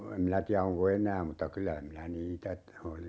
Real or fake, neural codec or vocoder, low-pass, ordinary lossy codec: real; none; none; none